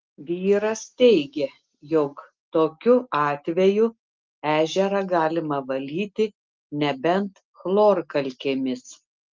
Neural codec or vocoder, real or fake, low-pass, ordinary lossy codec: none; real; 7.2 kHz; Opus, 32 kbps